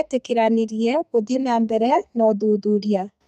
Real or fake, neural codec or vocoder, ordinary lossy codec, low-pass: fake; codec, 32 kHz, 1.9 kbps, SNAC; MP3, 96 kbps; 14.4 kHz